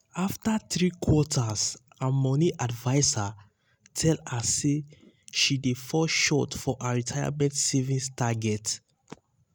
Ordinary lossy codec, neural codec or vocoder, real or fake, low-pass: none; none; real; none